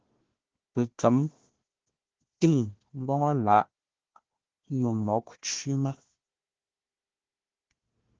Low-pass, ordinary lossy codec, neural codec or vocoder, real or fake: 7.2 kHz; Opus, 16 kbps; codec, 16 kHz, 1 kbps, FunCodec, trained on Chinese and English, 50 frames a second; fake